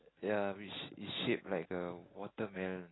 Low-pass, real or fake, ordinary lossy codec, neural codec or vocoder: 7.2 kHz; real; AAC, 16 kbps; none